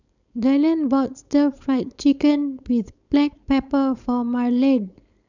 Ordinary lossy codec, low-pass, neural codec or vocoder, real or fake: none; 7.2 kHz; codec, 16 kHz, 4.8 kbps, FACodec; fake